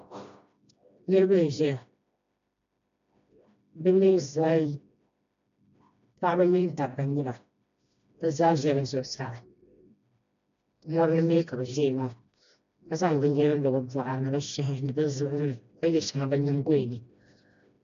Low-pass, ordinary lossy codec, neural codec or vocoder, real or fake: 7.2 kHz; MP3, 64 kbps; codec, 16 kHz, 1 kbps, FreqCodec, smaller model; fake